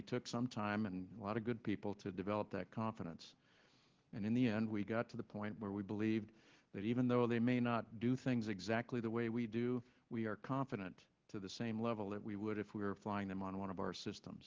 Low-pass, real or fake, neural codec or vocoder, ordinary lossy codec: 7.2 kHz; real; none; Opus, 16 kbps